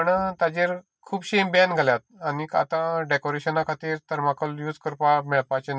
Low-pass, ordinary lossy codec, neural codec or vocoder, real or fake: none; none; none; real